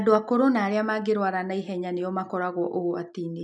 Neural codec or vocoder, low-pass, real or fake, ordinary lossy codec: none; none; real; none